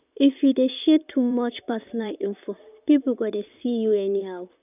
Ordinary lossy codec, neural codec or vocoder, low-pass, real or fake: none; vocoder, 44.1 kHz, 128 mel bands, Pupu-Vocoder; 3.6 kHz; fake